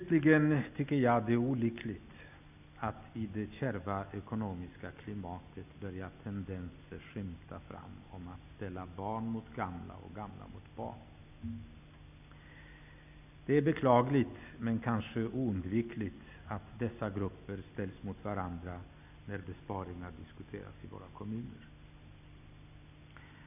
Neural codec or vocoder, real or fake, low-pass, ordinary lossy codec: none; real; 3.6 kHz; none